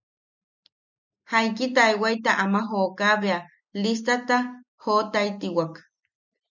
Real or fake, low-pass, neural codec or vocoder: real; 7.2 kHz; none